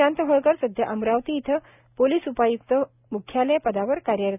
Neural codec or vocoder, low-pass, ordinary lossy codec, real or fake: none; 3.6 kHz; none; real